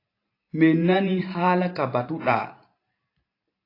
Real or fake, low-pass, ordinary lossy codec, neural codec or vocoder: fake; 5.4 kHz; AAC, 32 kbps; vocoder, 44.1 kHz, 128 mel bands every 512 samples, BigVGAN v2